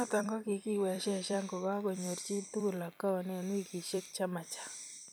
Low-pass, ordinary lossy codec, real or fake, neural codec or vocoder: none; none; fake; vocoder, 44.1 kHz, 128 mel bands every 256 samples, BigVGAN v2